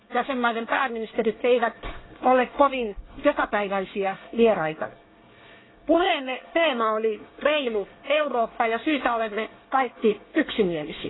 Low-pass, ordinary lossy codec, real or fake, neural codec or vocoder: 7.2 kHz; AAC, 16 kbps; fake; codec, 24 kHz, 1 kbps, SNAC